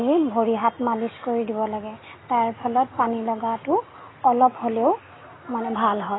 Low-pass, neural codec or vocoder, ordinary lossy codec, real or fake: 7.2 kHz; none; AAC, 16 kbps; real